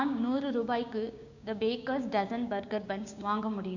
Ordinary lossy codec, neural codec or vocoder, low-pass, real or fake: none; codec, 16 kHz, 6 kbps, DAC; 7.2 kHz; fake